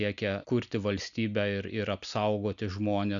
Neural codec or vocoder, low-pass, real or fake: none; 7.2 kHz; real